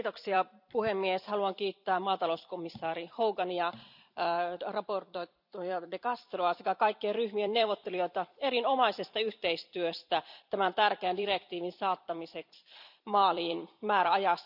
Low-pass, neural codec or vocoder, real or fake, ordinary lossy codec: 5.4 kHz; vocoder, 44.1 kHz, 128 mel bands every 256 samples, BigVGAN v2; fake; none